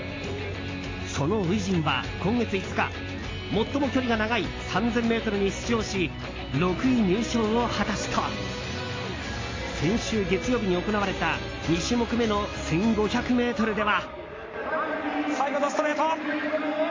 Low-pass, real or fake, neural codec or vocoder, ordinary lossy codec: 7.2 kHz; real; none; AAC, 32 kbps